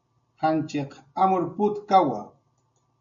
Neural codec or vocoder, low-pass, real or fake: none; 7.2 kHz; real